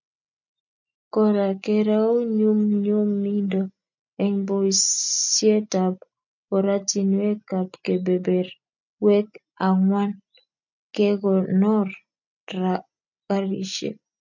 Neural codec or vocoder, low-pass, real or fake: none; 7.2 kHz; real